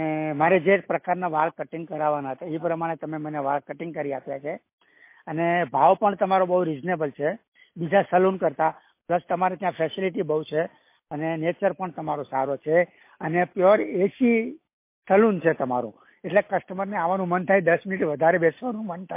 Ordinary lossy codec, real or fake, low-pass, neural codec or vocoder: MP3, 24 kbps; real; 3.6 kHz; none